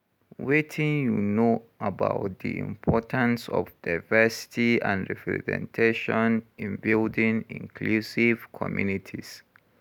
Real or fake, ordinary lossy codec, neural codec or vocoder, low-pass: real; none; none; 19.8 kHz